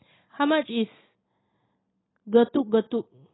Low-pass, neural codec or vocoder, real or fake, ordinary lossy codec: 7.2 kHz; none; real; AAC, 16 kbps